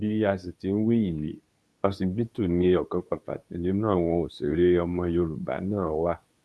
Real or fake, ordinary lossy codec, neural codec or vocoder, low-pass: fake; none; codec, 24 kHz, 0.9 kbps, WavTokenizer, medium speech release version 2; none